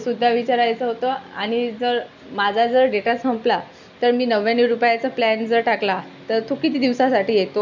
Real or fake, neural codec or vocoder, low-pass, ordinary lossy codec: real; none; 7.2 kHz; none